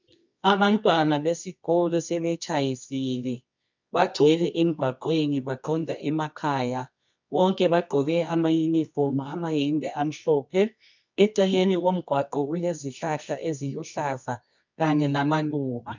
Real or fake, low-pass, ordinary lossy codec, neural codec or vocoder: fake; 7.2 kHz; MP3, 64 kbps; codec, 24 kHz, 0.9 kbps, WavTokenizer, medium music audio release